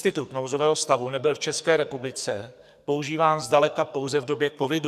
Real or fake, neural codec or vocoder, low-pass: fake; codec, 44.1 kHz, 2.6 kbps, SNAC; 14.4 kHz